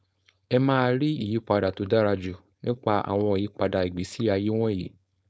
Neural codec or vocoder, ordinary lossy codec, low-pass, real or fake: codec, 16 kHz, 4.8 kbps, FACodec; none; none; fake